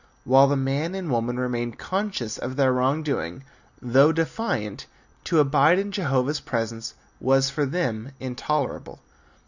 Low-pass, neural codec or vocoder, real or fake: 7.2 kHz; none; real